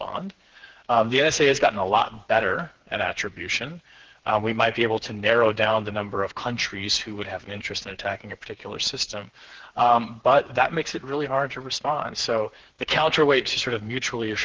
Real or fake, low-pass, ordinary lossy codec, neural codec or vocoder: fake; 7.2 kHz; Opus, 16 kbps; codec, 16 kHz, 4 kbps, FreqCodec, smaller model